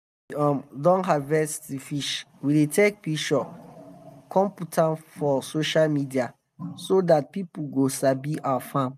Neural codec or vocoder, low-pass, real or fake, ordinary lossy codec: none; 14.4 kHz; real; none